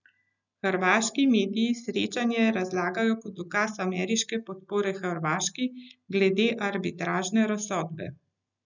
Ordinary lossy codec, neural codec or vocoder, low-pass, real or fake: none; none; 7.2 kHz; real